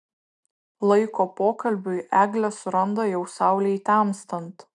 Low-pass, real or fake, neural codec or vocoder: 10.8 kHz; real; none